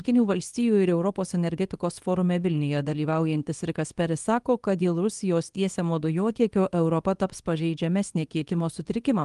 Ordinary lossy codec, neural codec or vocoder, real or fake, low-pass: Opus, 24 kbps; codec, 24 kHz, 0.9 kbps, WavTokenizer, small release; fake; 10.8 kHz